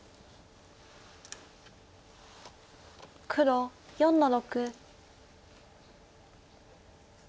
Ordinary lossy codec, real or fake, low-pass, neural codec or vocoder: none; real; none; none